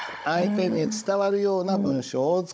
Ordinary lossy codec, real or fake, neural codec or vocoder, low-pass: none; fake; codec, 16 kHz, 16 kbps, FunCodec, trained on Chinese and English, 50 frames a second; none